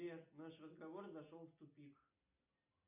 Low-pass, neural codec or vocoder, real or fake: 3.6 kHz; none; real